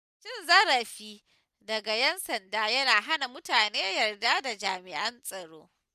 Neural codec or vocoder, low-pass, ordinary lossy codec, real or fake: none; 14.4 kHz; none; real